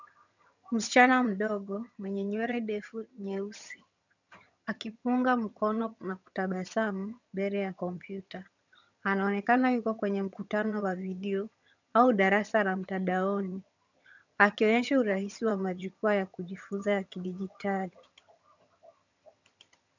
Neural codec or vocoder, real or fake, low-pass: vocoder, 22.05 kHz, 80 mel bands, HiFi-GAN; fake; 7.2 kHz